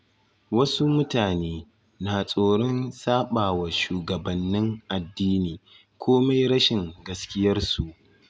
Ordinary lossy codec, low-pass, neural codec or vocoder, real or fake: none; none; none; real